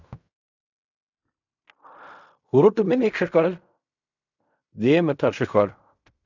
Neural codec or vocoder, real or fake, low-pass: codec, 16 kHz in and 24 kHz out, 0.4 kbps, LongCat-Audio-Codec, fine tuned four codebook decoder; fake; 7.2 kHz